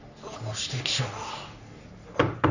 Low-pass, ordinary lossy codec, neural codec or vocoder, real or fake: 7.2 kHz; none; codec, 44.1 kHz, 3.4 kbps, Pupu-Codec; fake